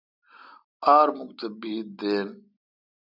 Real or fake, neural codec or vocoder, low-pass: real; none; 5.4 kHz